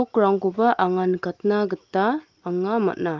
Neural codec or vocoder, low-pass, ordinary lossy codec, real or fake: none; 7.2 kHz; Opus, 32 kbps; real